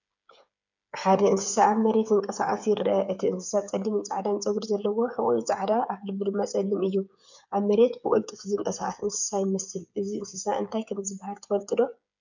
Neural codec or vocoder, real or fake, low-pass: codec, 16 kHz, 8 kbps, FreqCodec, smaller model; fake; 7.2 kHz